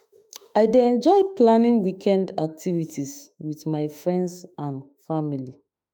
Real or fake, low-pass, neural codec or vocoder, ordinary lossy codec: fake; none; autoencoder, 48 kHz, 32 numbers a frame, DAC-VAE, trained on Japanese speech; none